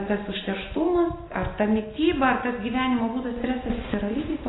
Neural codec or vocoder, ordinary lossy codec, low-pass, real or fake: none; AAC, 16 kbps; 7.2 kHz; real